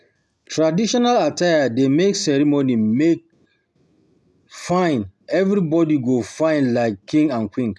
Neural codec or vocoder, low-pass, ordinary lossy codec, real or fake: none; none; none; real